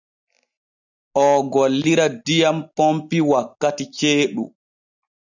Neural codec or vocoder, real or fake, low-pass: none; real; 7.2 kHz